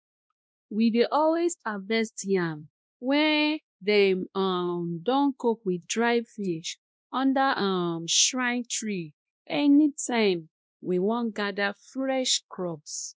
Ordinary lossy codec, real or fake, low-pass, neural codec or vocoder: none; fake; none; codec, 16 kHz, 1 kbps, X-Codec, WavLM features, trained on Multilingual LibriSpeech